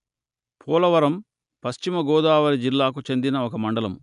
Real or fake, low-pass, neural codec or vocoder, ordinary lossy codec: real; 10.8 kHz; none; none